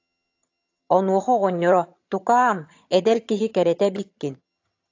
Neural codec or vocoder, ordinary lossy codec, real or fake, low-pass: vocoder, 22.05 kHz, 80 mel bands, HiFi-GAN; AAC, 48 kbps; fake; 7.2 kHz